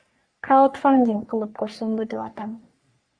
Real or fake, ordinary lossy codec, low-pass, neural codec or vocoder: fake; Opus, 64 kbps; 9.9 kHz; codec, 44.1 kHz, 3.4 kbps, Pupu-Codec